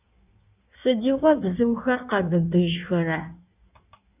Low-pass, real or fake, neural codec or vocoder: 3.6 kHz; fake; codec, 16 kHz in and 24 kHz out, 1.1 kbps, FireRedTTS-2 codec